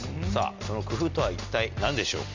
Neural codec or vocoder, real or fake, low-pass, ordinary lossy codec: none; real; 7.2 kHz; MP3, 64 kbps